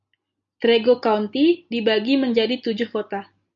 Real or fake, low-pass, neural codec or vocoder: real; 7.2 kHz; none